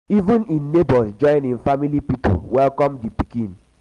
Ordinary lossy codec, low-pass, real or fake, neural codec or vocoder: Opus, 24 kbps; 10.8 kHz; real; none